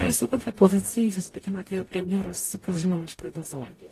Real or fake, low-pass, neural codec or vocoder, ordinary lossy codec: fake; 14.4 kHz; codec, 44.1 kHz, 0.9 kbps, DAC; AAC, 48 kbps